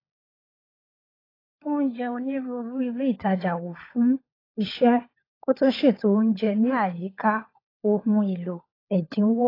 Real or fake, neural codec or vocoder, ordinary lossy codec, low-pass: fake; codec, 16 kHz, 16 kbps, FunCodec, trained on LibriTTS, 50 frames a second; AAC, 24 kbps; 5.4 kHz